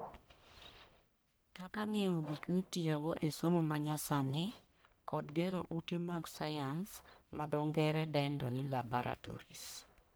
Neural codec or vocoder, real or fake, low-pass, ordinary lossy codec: codec, 44.1 kHz, 1.7 kbps, Pupu-Codec; fake; none; none